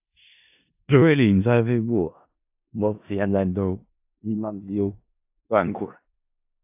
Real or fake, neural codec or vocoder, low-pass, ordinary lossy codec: fake; codec, 16 kHz in and 24 kHz out, 0.4 kbps, LongCat-Audio-Codec, four codebook decoder; 3.6 kHz; AAC, 32 kbps